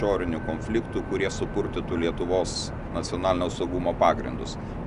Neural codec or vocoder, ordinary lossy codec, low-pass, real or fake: none; Opus, 64 kbps; 10.8 kHz; real